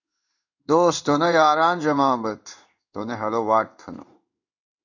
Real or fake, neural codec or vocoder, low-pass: fake; codec, 16 kHz in and 24 kHz out, 1 kbps, XY-Tokenizer; 7.2 kHz